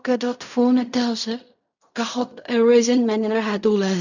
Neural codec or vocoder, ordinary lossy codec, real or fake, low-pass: codec, 16 kHz in and 24 kHz out, 0.4 kbps, LongCat-Audio-Codec, fine tuned four codebook decoder; none; fake; 7.2 kHz